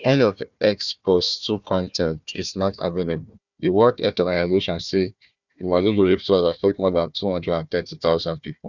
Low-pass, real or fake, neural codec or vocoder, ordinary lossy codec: 7.2 kHz; fake; codec, 16 kHz, 1 kbps, FunCodec, trained on Chinese and English, 50 frames a second; none